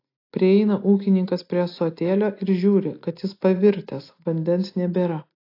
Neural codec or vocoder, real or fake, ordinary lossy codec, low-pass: none; real; AAC, 32 kbps; 5.4 kHz